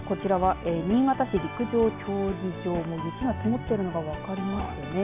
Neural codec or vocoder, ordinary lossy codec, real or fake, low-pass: none; MP3, 32 kbps; real; 3.6 kHz